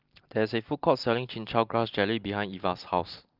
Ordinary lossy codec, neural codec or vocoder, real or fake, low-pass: Opus, 24 kbps; none; real; 5.4 kHz